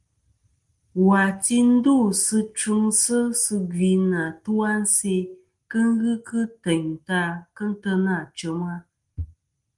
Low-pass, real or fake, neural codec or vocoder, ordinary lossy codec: 10.8 kHz; real; none; Opus, 24 kbps